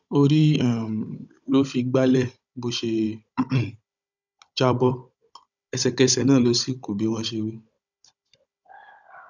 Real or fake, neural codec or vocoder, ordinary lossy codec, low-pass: fake; codec, 16 kHz, 16 kbps, FunCodec, trained on Chinese and English, 50 frames a second; none; 7.2 kHz